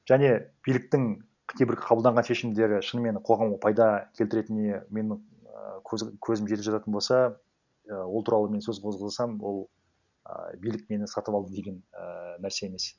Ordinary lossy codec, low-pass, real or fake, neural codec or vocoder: none; 7.2 kHz; real; none